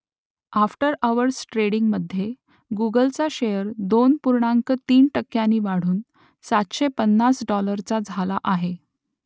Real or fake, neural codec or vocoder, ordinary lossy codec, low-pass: real; none; none; none